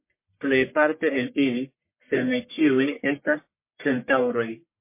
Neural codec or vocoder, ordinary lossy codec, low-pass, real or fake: codec, 44.1 kHz, 1.7 kbps, Pupu-Codec; MP3, 24 kbps; 3.6 kHz; fake